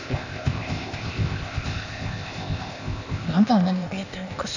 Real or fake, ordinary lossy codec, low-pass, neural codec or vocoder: fake; none; 7.2 kHz; codec, 16 kHz, 0.8 kbps, ZipCodec